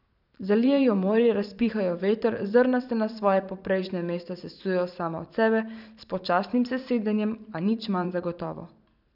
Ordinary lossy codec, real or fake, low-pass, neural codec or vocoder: none; fake; 5.4 kHz; vocoder, 44.1 kHz, 128 mel bands every 512 samples, BigVGAN v2